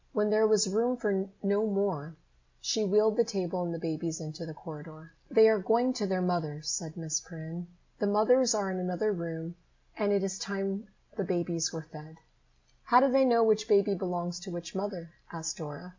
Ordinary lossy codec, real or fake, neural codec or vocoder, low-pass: MP3, 64 kbps; real; none; 7.2 kHz